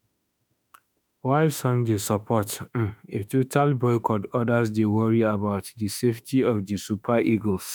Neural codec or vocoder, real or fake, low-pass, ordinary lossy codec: autoencoder, 48 kHz, 32 numbers a frame, DAC-VAE, trained on Japanese speech; fake; none; none